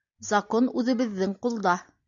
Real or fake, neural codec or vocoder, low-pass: real; none; 7.2 kHz